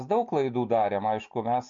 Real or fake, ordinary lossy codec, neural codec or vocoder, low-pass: real; MP3, 48 kbps; none; 7.2 kHz